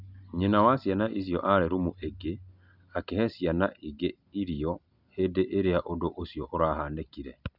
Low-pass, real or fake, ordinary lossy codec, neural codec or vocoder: 5.4 kHz; real; none; none